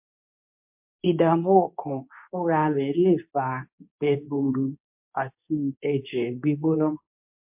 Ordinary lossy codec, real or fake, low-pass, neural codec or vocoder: MP3, 32 kbps; fake; 3.6 kHz; codec, 24 kHz, 0.9 kbps, WavTokenizer, medium speech release version 2